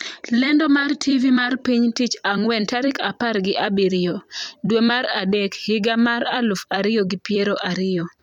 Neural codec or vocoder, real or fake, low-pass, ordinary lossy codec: vocoder, 44.1 kHz, 128 mel bands every 256 samples, BigVGAN v2; fake; 19.8 kHz; MP3, 96 kbps